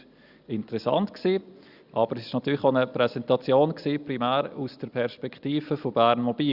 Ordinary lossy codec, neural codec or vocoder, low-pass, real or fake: Opus, 64 kbps; none; 5.4 kHz; real